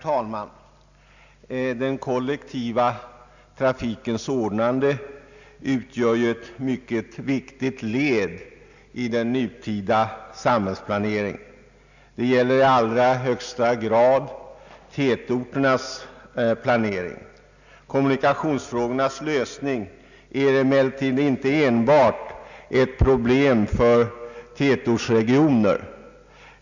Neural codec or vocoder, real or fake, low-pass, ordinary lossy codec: none; real; 7.2 kHz; none